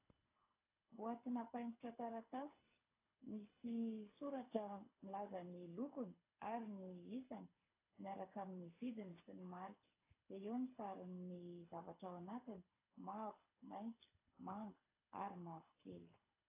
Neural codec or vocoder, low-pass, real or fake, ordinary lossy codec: codec, 24 kHz, 6 kbps, HILCodec; 3.6 kHz; fake; AAC, 24 kbps